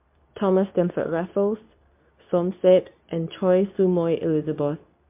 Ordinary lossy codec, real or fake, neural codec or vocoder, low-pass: MP3, 32 kbps; fake; codec, 24 kHz, 0.9 kbps, WavTokenizer, medium speech release version 2; 3.6 kHz